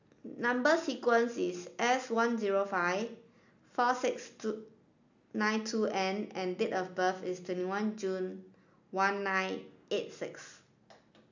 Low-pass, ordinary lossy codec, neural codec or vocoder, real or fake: 7.2 kHz; none; none; real